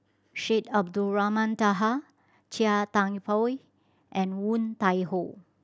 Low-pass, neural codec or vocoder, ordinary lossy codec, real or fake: none; none; none; real